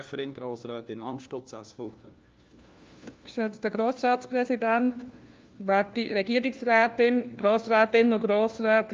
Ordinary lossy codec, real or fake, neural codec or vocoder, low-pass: Opus, 24 kbps; fake; codec, 16 kHz, 1 kbps, FunCodec, trained on LibriTTS, 50 frames a second; 7.2 kHz